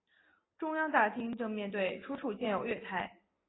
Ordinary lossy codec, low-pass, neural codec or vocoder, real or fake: AAC, 16 kbps; 7.2 kHz; none; real